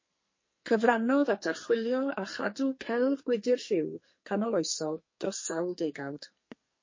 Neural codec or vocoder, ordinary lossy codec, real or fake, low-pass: codec, 44.1 kHz, 2.6 kbps, SNAC; MP3, 32 kbps; fake; 7.2 kHz